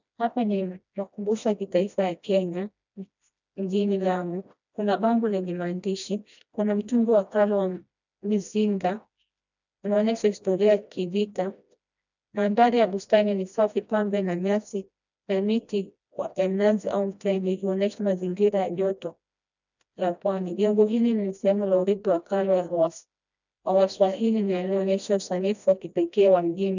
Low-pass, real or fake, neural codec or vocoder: 7.2 kHz; fake; codec, 16 kHz, 1 kbps, FreqCodec, smaller model